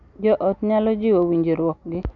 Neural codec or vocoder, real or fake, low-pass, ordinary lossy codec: none; real; 7.2 kHz; none